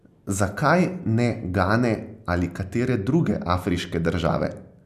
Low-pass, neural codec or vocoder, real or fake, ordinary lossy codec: 14.4 kHz; none; real; none